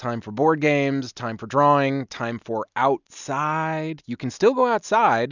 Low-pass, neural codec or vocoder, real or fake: 7.2 kHz; none; real